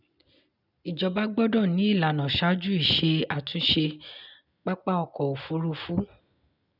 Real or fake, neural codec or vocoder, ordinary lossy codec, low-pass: real; none; none; 5.4 kHz